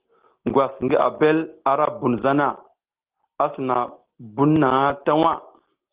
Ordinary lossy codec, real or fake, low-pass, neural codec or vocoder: Opus, 16 kbps; real; 3.6 kHz; none